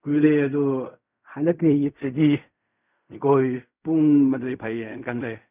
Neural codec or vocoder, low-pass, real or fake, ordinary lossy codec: codec, 16 kHz in and 24 kHz out, 0.4 kbps, LongCat-Audio-Codec, fine tuned four codebook decoder; 3.6 kHz; fake; none